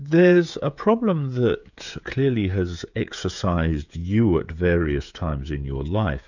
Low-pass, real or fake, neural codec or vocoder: 7.2 kHz; fake; codec, 16 kHz, 16 kbps, FreqCodec, smaller model